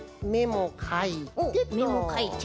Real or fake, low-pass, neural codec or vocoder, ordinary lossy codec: real; none; none; none